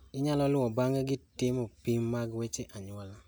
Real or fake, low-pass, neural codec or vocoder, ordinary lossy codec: real; none; none; none